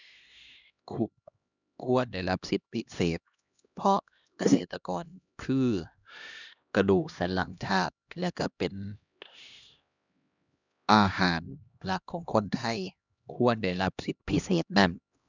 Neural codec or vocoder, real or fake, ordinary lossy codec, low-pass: codec, 16 kHz, 1 kbps, X-Codec, HuBERT features, trained on LibriSpeech; fake; none; 7.2 kHz